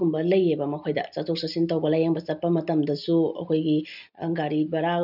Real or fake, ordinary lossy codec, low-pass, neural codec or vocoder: real; none; 5.4 kHz; none